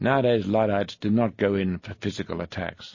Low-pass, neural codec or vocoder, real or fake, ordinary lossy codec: 7.2 kHz; none; real; MP3, 32 kbps